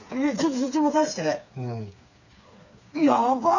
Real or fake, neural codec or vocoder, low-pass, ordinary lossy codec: fake; codec, 16 kHz, 4 kbps, FreqCodec, smaller model; 7.2 kHz; none